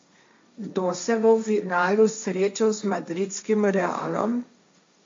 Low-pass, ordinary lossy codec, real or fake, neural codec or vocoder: 7.2 kHz; MP3, 48 kbps; fake; codec, 16 kHz, 1.1 kbps, Voila-Tokenizer